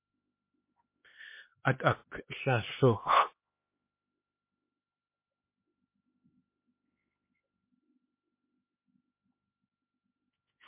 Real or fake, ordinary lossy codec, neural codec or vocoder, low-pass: fake; MP3, 24 kbps; codec, 16 kHz, 2 kbps, X-Codec, HuBERT features, trained on LibriSpeech; 3.6 kHz